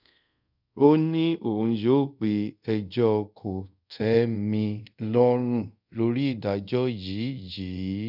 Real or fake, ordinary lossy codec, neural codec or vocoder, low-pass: fake; none; codec, 24 kHz, 0.5 kbps, DualCodec; 5.4 kHz